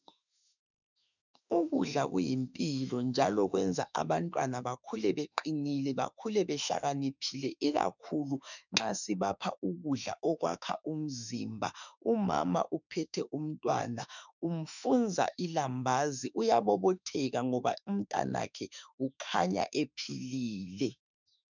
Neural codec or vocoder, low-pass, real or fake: autoencoder, 48 kHz, 32 numbers a frame, DAC-VAE, trained on Japanese speech; 7.2 kHz; fake